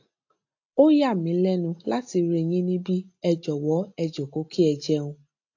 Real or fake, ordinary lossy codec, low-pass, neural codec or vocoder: real; AAC, 48 kbps; 7.2 kHz; none